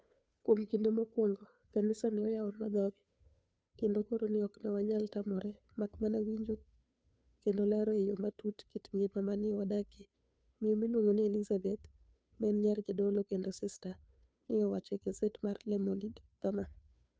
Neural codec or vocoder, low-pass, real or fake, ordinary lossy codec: codec, 16 kHz, 2 kbps, FunCodec, trained on Chinese and English, 25 frames a second; none; fake; none